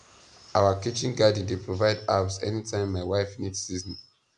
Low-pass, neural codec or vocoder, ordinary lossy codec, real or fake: 9.9 kHz; none; none; real